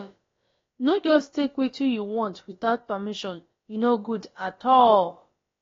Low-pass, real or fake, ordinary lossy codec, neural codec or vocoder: 7.2 kHz; fake; AAC, 32 kbps; codec, 16 kHz, about 1 kbps, DyCAST, with the encoder's durations